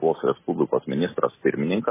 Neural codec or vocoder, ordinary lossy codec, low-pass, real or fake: none; MP3, 16 kbps; 3.6 kHz; real